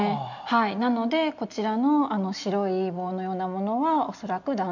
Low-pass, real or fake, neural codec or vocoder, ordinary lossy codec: 7.2 kHz; real; none; none